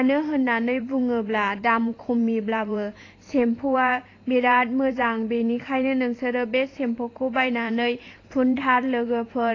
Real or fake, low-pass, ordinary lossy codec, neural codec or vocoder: fake; 7.2 kHz; AAC, 32 kbps; vocoder, 44.1 kHz, 128 mel bands every 512 samples, BigVGAN v2